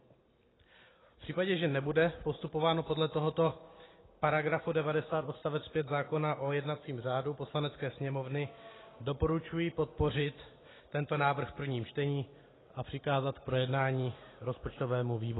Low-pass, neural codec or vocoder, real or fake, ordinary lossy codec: 7.2 kHz; none; real; AAC, 16 kbps